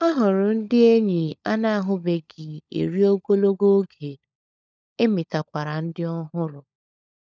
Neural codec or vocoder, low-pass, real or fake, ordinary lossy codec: codec, 16 kHz, 16 kbps, FunCodec, trained on LibriTTS, 50 frames a second; none; fake; none